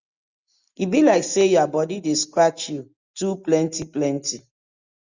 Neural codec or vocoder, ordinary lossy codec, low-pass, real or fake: none; Opus, 64 kbps; 7.2 kHz; real